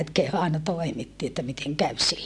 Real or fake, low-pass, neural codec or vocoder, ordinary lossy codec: real; none; none; none